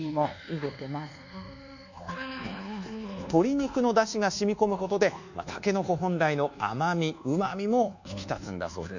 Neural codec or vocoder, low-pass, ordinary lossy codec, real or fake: codec, 24 kHz, 1.2 kbps, DualCodec; 7.2 kHz; none; fake